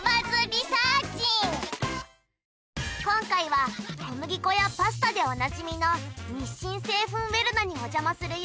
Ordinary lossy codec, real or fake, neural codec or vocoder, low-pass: none; real; none; none